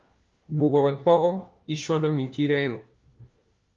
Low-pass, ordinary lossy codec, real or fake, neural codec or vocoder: 7.2 kHz; Opus, 32 kbps; fake; codec, 16 kHz, 1 kbps, FunCodec, trained on LibriTTS, 50 frames a second